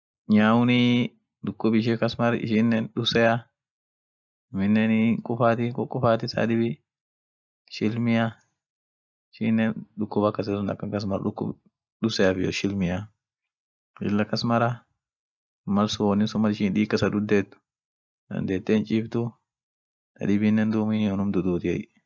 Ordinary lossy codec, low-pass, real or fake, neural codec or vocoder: none; none; real; none